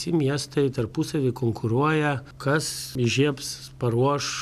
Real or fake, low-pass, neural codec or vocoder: real; 14.4 kHz; none